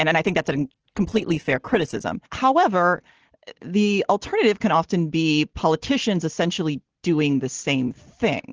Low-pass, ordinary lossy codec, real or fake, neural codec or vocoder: 7.2 kHz; Opus, 16 kbps; real; none